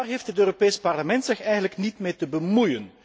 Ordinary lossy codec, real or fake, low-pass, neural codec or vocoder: none; real; none; none